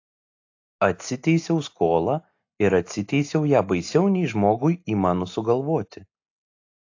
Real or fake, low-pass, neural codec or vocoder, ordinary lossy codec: real; 7.2 kHz; none; AAC, 48 kbps